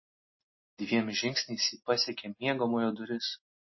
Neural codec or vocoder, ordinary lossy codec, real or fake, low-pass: none; MP3, 24 kbps; real; 7.2 kHz